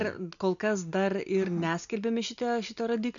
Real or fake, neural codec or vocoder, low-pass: real; none; 7.2 kHz